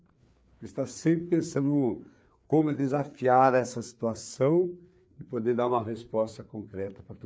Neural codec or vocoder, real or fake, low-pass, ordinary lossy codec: codec, 16 kHz, 4 kbps, FreqCodec, larger model; fake; none; none